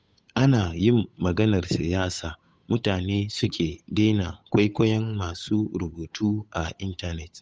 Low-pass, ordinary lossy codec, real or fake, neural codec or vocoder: none; none; fake; codec, 16 kHz, 8 kbps, FunCodec, trained on Chinese and English, 25 frames a second